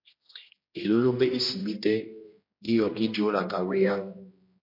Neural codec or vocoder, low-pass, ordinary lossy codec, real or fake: codec, 16 kHz, 1 kbps, X-Codec, HuBERT features, trained on general audio; 5.4 kHz; MP3, 32 kbps; fake